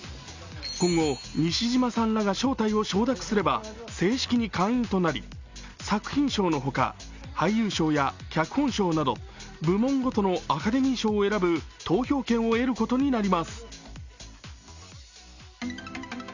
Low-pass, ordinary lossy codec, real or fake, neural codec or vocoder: 7.2 kHz; Opus, 64 kbps; real; none